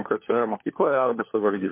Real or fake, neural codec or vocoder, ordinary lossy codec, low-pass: fake; codec, 16 kHz, 4 kbps, FunCodec, trained on LibriTTS, 50 frames a second; MP3, 24 kbps; 3.6 kHz